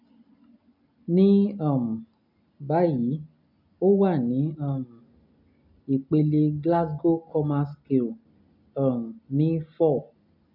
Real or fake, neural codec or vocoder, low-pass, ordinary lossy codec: real; none; 5.4 kHz; none